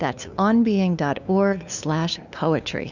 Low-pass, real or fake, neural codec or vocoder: 7.2 kHz; fake; codec, 16 kHz, 2 kbps, FunCodec, trained on LibriTTS, 25 frames a second